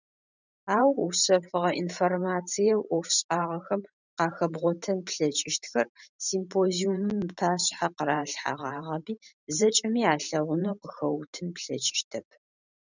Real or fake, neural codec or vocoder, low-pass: fake; vocoder, 44.1 kHz, 128 mel bands every 512 samples, BigVGAN v2; 7.2 kHz